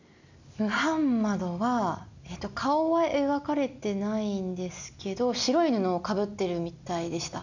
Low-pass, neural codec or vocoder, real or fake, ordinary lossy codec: 7.2 kHz; none; real; none